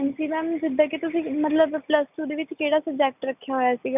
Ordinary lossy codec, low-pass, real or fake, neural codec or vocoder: none; 3.6 kHz; real; none